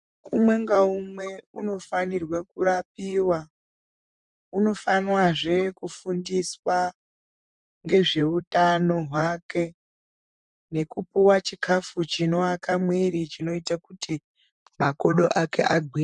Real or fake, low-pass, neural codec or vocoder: fake; 10.8 kHz; vocoder, 44.1 kHz, 128 mel bands, Pupu-Vocoder